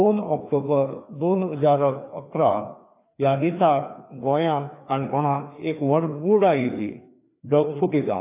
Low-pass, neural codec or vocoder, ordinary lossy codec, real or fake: 3.6 kHz; codec, 16 kHz, 2 kbps, FreqCodec, larger model; AAC, 24 kbps; fake